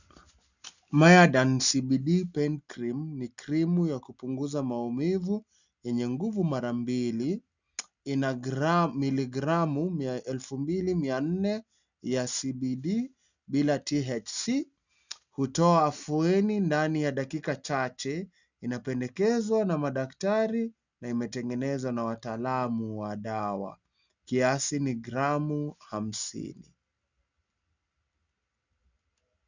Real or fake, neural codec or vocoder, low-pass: real; none; 7.2 kHz